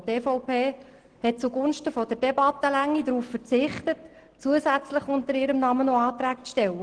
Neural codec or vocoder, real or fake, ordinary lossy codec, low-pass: none; real; Opus, 16 kbps; 9.9 kHz